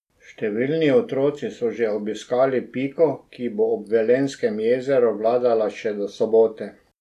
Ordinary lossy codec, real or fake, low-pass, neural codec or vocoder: none; real; 14.4 kHz; none